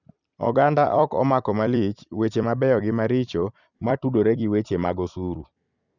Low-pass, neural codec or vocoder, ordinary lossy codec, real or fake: 7.2 kHz; vocoder, 44.1 kHz, 128 mel bands every 256 samples, BigVGAN v2; none; fake